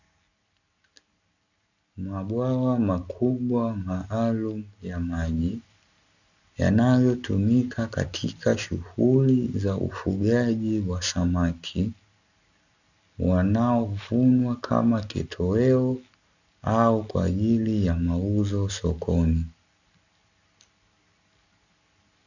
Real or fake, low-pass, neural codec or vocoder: real; 7.2 kHz; none